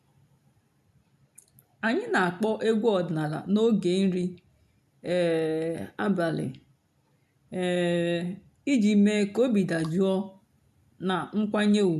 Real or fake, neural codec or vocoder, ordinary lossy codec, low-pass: real; none; AAC, 96 kbps; 14.4 kHz